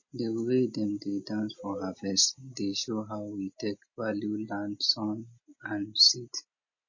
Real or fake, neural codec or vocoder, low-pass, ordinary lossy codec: real; none; 7.2 kHz; MP3, 32 kbps